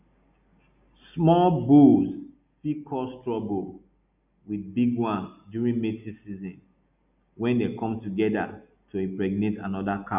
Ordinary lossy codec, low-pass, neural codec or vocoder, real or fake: none; 3.6 kHz; none; real